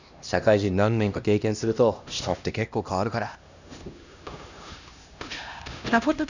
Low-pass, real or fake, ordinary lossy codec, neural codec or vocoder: 7.2 kHz; fake; none; codec, 16 kHz, 1 kbps, X-Codec, HuBERT features, trained on LibriSpeech